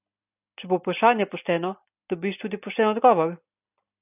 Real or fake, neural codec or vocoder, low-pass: real; none; 3.6 kHz